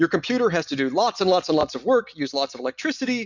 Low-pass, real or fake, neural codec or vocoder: 7.2 kHz; real; none